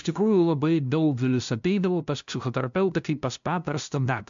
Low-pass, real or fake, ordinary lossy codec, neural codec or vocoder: 7.2 kHz; fake; MP3, 64 kbps; codec, 16 kHz, 0.5 kbps, FunCodec, trained on LibriTTS, 25 frames a second